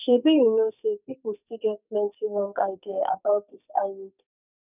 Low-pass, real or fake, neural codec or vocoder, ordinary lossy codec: 3.6 kHz; fake; codec, 44.1 kHz, 2.6 kbps, SNAC; none